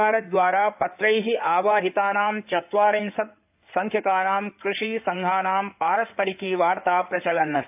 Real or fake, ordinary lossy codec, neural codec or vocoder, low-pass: fake; AAC, 32 kbps; codec, 16 kHz in and 24 kHz out, 2.2 kbps, FireRedTTS-2 codec; 3.6 kHz